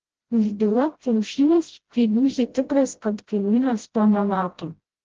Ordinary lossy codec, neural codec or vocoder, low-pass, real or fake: Opus, 16 kbps; codec, 16 kHz, 0.5 kbps, FreqCodec, smaller model; 7.2 kHz; fake